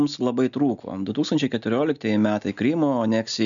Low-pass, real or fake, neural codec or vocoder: 7.2 kHz; real; none